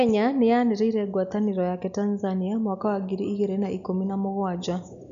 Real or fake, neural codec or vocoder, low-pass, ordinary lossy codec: real; none; 7.2 kHz; none